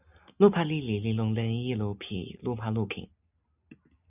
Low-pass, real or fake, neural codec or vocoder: 3.6 kHz; real; none